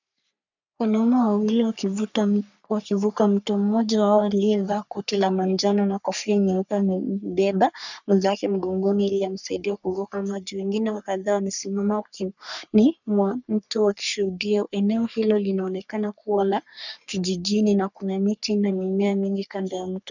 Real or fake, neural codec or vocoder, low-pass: fake; codec, 44.1 kHz, 3.4 kbps, Pupu-Codec; 7.2 kHz